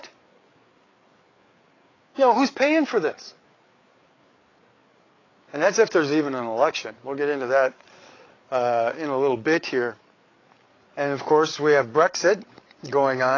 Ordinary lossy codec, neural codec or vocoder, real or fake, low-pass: AAC, 32 kbps; codec, 16 kHz, 8 kbps, FreqCodec, larger model; fake; 7.2 kHz